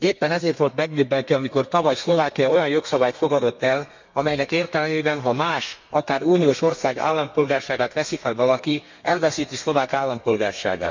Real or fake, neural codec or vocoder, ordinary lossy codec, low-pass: fake; codec, 32 kHz, 1.9 kbps, SNAC; MP3, 64 kbps; 7.2 kHz